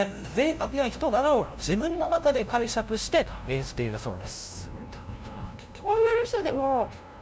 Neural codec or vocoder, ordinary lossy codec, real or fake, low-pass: codec, 16 kHz, 0.5 kbps, FunCodec, trained on LibriTTS, 25 frames a second; none; fake; none